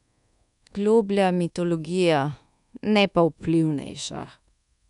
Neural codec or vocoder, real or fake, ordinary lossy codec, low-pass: codec, 24 kHz, 1.2 kbps, DualCodec; fake; none; 10.8 kHz